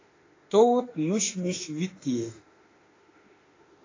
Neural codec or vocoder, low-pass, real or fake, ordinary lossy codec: autoencoder, 48 kHz, 32 numbers a frame, DAC-VAE, trained on Japanese speech; 7.2 kHz; fake; AAC, 32 kbps